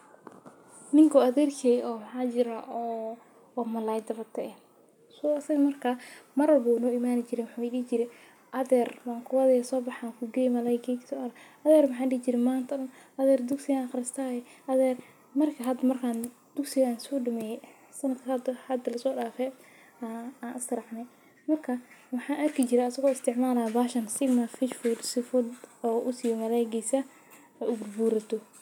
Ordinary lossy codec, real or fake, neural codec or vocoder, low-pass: none; real; none; 19.8 kHz